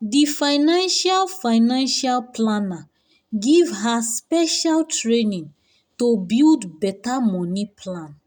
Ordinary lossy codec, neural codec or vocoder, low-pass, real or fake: none; none; 19.8 kHz; real